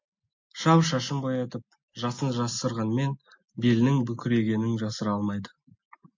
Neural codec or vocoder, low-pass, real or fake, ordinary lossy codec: none; 7.2 kHz; real; MP3, 48 kbps